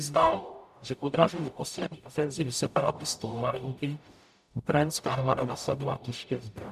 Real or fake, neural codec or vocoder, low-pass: fake; codec, 44.1 kHz, 0.9 kbps, DAC; 14.4 kHz